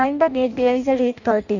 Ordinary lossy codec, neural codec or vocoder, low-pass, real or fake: none; codec, 16 kHz in and 24 kHz out, 0.6 kbps, FireRedTTS-2 codec; 7.2 kHz; fake